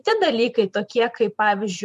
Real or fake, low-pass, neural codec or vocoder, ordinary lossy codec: fake; 14.4 kHz; vocoder, 44.1 kHz, 128 mel bands every 512 samples, BigVGAN v2; MP3, 64 kbps